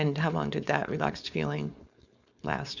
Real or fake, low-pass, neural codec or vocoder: fake; 7.2 kHz; codec, 16 kHz, 4.8 kbps, FACodec